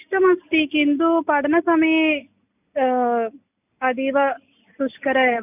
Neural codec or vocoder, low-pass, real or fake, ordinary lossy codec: none; 3.6 kHz; real; none